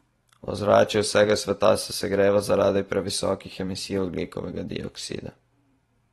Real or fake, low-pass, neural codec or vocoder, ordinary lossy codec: fake; 19.8 kHz; vocoder, 44.1 kHz, 128 mel bands every 512 samples, BigVGAN v2; AAC, 32 kbps